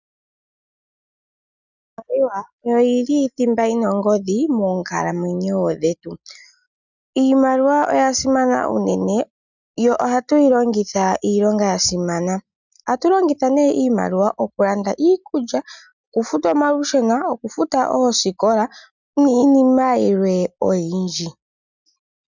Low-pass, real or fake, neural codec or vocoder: 7.2 kHz; real; none